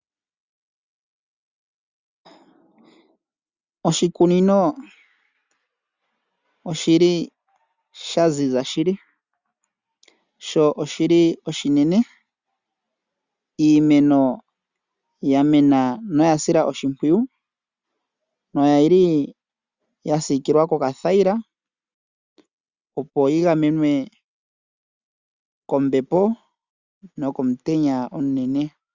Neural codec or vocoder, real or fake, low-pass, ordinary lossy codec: none; real; 7.2 kHz; Opus, 64 kbps